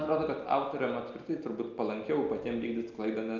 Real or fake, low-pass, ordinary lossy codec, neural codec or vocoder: real; 7.2 kHz; Opus, 32 kbps; none